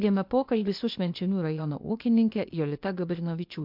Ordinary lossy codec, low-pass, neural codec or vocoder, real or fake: MP3, 48 kbps; 5.4 kHz; codec, 16 kHz, 0.8 kbps, ZipCodec; fake